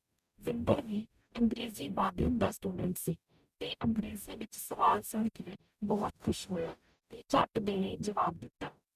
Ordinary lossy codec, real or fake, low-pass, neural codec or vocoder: none; fake; 14.4 kHz; codec, 44.1 kHz, 0.9 kbps, DAC